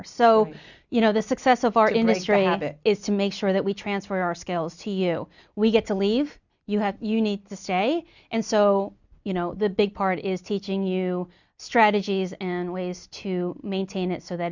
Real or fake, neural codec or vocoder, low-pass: real; none; 7.2 kHz